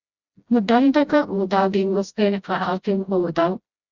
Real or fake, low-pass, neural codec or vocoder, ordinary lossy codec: fake; 7.2 kHz; codec, 16 kHz, 0.5 kbps, FreqCodec, smaller model; Opus, 64 kbps